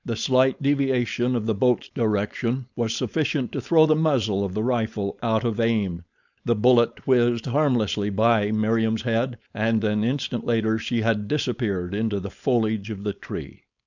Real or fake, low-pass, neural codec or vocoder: fake; 7.2 kHz; codec, 16 kHz, 4.8 kbps, FACodec